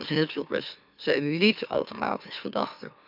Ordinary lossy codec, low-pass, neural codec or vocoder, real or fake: none; 5.4 kHz; autoencoder, 44.1 kHz, a latent of 192 numbers a frame, MeloTTS; fake